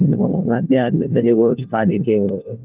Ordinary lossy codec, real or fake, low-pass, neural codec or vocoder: Opus, 32 kbps; fake; 3.6 kHz; codec, 16 kHz, 1 kbps, FunCodec, trained on LibriTTS, 50 frames a second